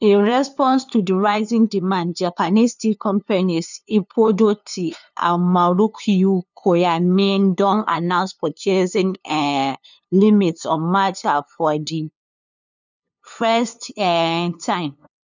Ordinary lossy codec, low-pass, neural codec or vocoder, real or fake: none; 7.2 kHz; codec, 16 kHz, 2 kbps, FunCodec, trained on LibriTTS, 25 frames a second; fake